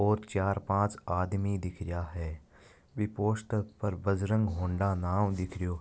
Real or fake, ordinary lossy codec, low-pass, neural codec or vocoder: real; none; none; none